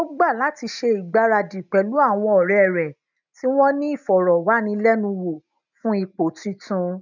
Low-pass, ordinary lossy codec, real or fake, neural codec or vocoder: 7.2 kHz; none; real; none